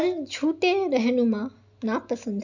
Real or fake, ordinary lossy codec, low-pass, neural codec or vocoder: real; none; 7.2 kHz; none